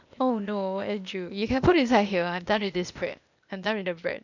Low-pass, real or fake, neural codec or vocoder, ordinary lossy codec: 7.2 kHz; fake; codec, 16 kHz, 0.8 kbps, ZipCodec; none